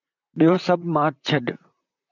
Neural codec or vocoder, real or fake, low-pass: vocoder, 44.1 kHz, 128 mel bands, Pupu-Vocoder; fake; 7.2 kHz